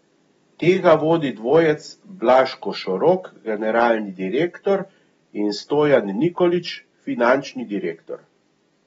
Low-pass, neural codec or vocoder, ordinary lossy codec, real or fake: 10.8 kHz; none; AAC, 24 kbps; real